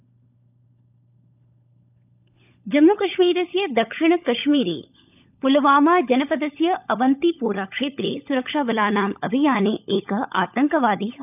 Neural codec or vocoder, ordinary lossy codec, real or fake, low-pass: codec, 16 kHz, 16 kbps, FunCodec, trained on LibriTTS, 50 frames a second; none; fake; 3.6 kHz